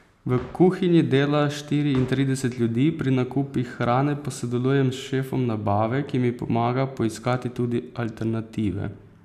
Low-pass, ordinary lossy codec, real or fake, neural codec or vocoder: 14.4 kHz; none; real; none